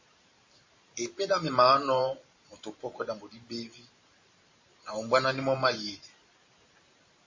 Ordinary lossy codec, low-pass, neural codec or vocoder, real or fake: MP3, 32 kbps; 7.2 kHz; vocoder, 44.1 kHz, 128 mel bands every 512 samples, BigVGAN v2; fake